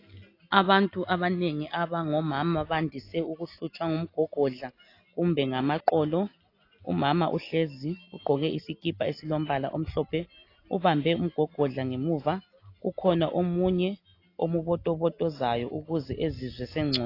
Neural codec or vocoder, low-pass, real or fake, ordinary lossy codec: none; 5.4 kHz; real; AAC, 32 kbps